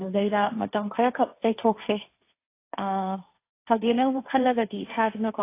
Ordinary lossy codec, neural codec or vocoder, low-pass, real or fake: AAC, 24 kbps; codec, 16 kHz, 1.1 kbps, Voila-Tokenizer; 3.6 kHz; fake